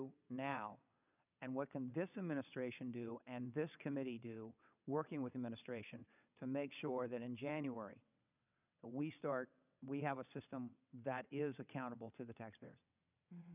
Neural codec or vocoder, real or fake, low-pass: vocoder, 22.05 kHz, 80 mel bands, WaveNeXt; fake; 3.6 kHz